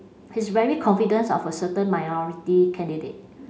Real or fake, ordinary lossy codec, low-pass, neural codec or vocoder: real; none; none; none